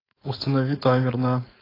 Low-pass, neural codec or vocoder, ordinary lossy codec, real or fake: 5.4 kHz; codec, 16 kHz, 4.8 kbps, FACodec; AAC, 24 kbps; fake